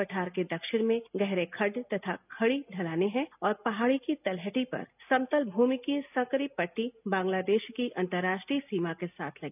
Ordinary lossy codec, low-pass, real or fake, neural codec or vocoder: none; 3.6 kHz; real; none